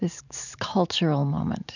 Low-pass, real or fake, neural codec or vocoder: 7.2 kHz; fake; vocoder, 44.1 kHz, 128 mel bands every 512 samples, BigVGAN v2